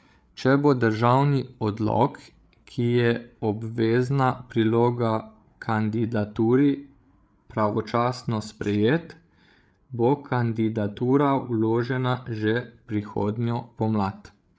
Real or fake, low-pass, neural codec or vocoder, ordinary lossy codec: fake; none; codec, 16 kHz, 8 kbps, FreqCodec, larger model; none